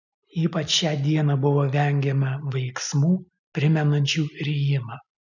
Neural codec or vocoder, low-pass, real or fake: none; 7.2 kHz; real